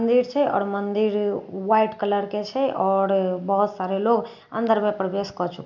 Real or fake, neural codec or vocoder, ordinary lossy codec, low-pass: real; none; none; 7.2 kHz